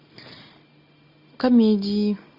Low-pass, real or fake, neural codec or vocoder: 5.4 kHz; real; none